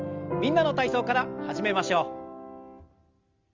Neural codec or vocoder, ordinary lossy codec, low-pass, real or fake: none; Opus, 32 kbps; 7.2 kHz; real